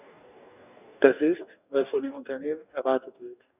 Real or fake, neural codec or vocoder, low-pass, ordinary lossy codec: fake; codec, 44.1 kHz, 2.6 kbps, DAC; 3.6 kHz; none